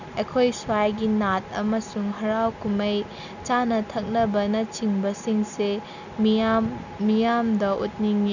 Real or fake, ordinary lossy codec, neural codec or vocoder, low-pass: real; none; none; 7.2 kHz